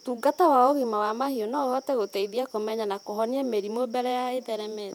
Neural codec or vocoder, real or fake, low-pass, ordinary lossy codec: vocoder, 48 kHz, 128 mel bands, Vocos; fake; 19.8 kHz; none